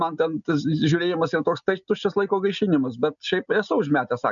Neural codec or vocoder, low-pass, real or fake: none; 7.2 kHz; real